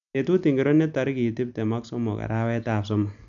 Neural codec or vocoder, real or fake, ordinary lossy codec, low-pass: none; real; none; 7.2 kHz